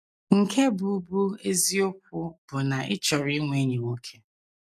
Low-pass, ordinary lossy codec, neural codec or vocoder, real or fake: 14.4 kHz; none; none; real